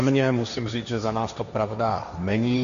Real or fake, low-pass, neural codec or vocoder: fake; 7.2 kHz; codec, 16 kHz, 1.1 kbps, Voila-Tokenizer